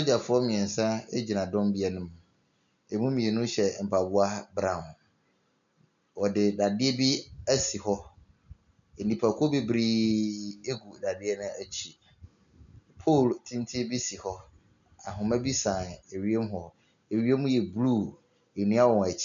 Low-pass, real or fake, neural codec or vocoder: 7.2 kHz; real; none